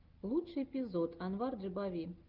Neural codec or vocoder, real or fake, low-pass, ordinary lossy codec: none; real; 5.4 kHz; Opus, 32 kbps